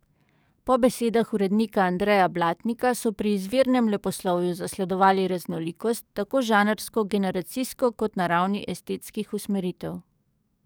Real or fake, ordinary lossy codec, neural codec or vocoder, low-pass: fake; none; codec, 44.1 kHz, 7.8 kbps, DAC; none